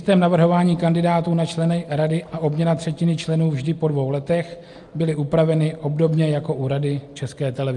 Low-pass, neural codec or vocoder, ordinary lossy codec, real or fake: 10.8 kHz; none; Opus, 32 kbps; real